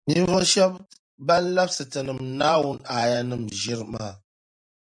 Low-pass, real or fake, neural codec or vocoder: 9.9 kHz; fake; vocoder, 44.1 kHz, 128 mel bands every 512 samples, BigVGAN v2